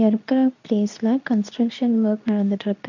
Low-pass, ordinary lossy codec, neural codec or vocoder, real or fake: 7.2 kHz; none; codec, 24 kHz, 0.9 kbps, WavTokenizer, medium speech release version 2; fake